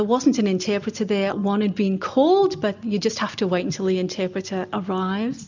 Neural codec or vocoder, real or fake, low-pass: none; real; 7.2 kHz